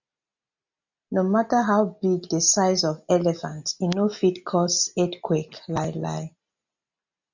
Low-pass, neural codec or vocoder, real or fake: 7.2 kHz; none; real